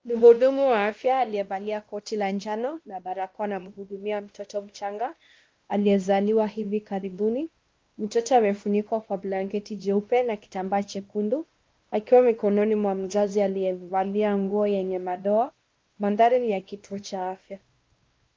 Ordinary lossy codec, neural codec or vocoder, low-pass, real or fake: Opus, 32 kbps; codec, 16 kHz, 1 kbps, X-Codec, WavLM features, trained on Multilingual LibriSpeech; 7.2 kHz; fake